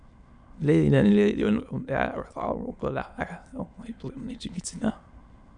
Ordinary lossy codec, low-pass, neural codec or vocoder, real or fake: none; 9.9 kHz; autoencoder, 22.05 kHz, a latent of 192 numbers a frame, VITS, trained on many speakers; fake